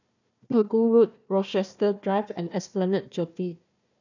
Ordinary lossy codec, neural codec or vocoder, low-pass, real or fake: none; codec, 16 kHz, 1 kbps, FunCodec, trained on Chinese and English, 50 frames a second; 7.2 kHz; fake